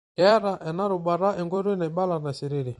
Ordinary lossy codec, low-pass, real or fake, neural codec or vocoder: MP3, 48 kbps; 19.8 kHz; fake; vocoder, 44.1 kHz, 128 mel bands every 256 samples, BigVGAN v2